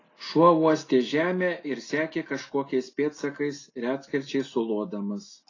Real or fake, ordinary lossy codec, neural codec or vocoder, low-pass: real; AAC, 32 kbps; none; 7.2 kHz